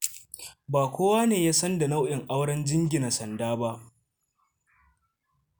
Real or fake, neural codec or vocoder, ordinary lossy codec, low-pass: real; none; none; none